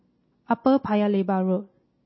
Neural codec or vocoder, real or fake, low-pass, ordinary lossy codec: none; real; 7.2 kHz; MP3, 24 kbps